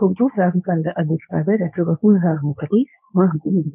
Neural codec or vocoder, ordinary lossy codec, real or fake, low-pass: codec, 24 kHz, 0.9 kbps, WavTokenizer, medium speech release version 2; none; fake; 3.6 kHz